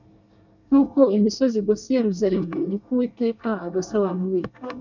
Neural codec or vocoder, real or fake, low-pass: codec, 24 kHz, 1 kbps, SNAC; fake; 7.2 kHz